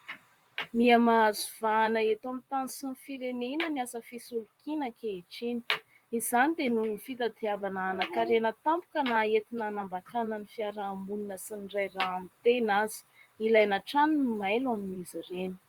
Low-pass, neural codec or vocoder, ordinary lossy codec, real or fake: 19.8 kHz; vocoder, 44.1 kHz, 128 mel bands, Pupu-Vocoder; Opus, 64 kbps; fake